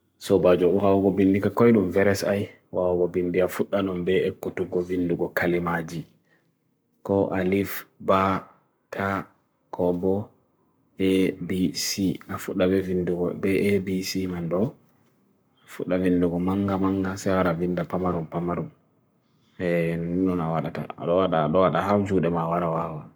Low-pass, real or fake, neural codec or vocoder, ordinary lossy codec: none; fake; codec, 44.1 kHz, 7.8 kbps, Pupu-Codec; none